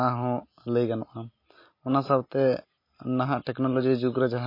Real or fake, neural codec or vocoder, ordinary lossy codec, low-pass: real; none; MP3, 24 kbps; 5.4 kHz